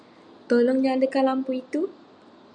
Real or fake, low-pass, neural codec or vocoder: real; 9.9 kHz; none